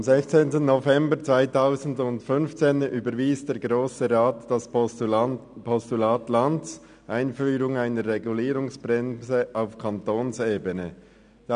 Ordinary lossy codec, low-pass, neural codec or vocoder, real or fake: none; 9.9 kHz; none; real